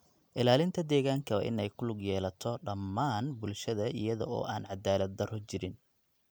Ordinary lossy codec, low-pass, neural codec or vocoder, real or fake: none; none; none; real